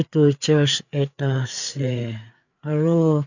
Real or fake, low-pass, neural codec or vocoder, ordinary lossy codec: fake; 7.2 kHz; codec, 16 kHz, 4 kbps, FreqCodec, larger model; none